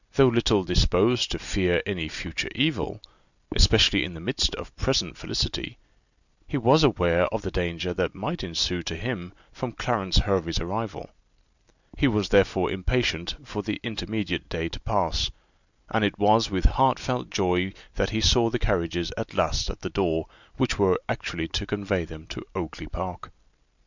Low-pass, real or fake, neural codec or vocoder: 7.2 kHz; real; none